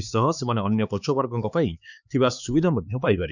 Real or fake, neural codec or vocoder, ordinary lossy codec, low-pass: fake; codec, 16 kHz, 4 kbps, X-Codec, HuBERT features, trained on LibriSpeech; none; 7.2 kHz